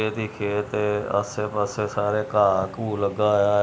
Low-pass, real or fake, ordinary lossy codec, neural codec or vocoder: none; real; none; none